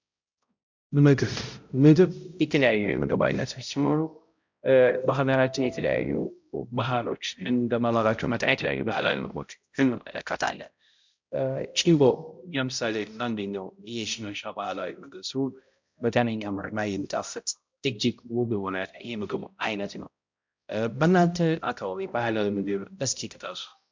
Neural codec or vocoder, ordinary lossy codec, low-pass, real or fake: codec, 16 kHz, 0.5 kbps, X-Codec, HuBERT features, trained on balanced general audio; MP3, 64 kbps; 7.2 kHz; fake